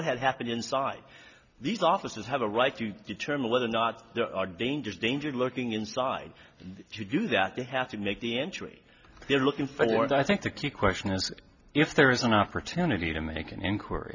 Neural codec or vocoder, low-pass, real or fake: none; 7.2 kHz; real